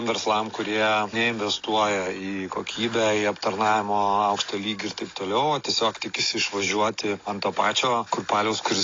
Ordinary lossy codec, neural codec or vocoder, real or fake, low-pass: AAC, 32 kbps; none; real; 7.2 kHz